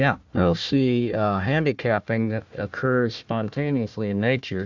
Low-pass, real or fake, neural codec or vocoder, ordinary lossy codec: 7.2 kHz; fake; codec, 16 kHz, 1 kbps, FunCodec, trained on Chinese and English, 50 frames a second; MP3, 64 kbps